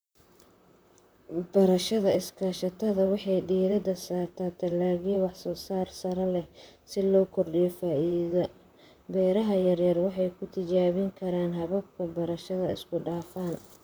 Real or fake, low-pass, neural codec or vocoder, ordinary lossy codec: fake; none; vocoder, 44.1 kHz, 128 mel bands, Pupu-Vocoder; none